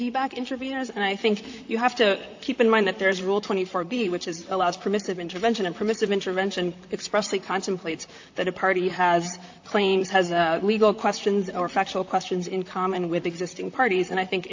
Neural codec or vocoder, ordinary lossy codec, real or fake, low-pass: vocoder, 44.1 kHz, 128 mel bands, Pupu-Vocoder; AAC, 48 kbps; fake; 7.2 kHz